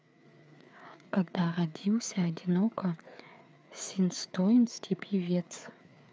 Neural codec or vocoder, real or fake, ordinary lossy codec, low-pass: codec, 16 kHz, 4 kbps, FreqCodec, larger model; fake; none; none